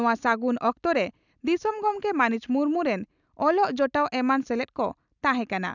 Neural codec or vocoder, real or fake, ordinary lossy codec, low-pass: none; real; none; 7.2 kHz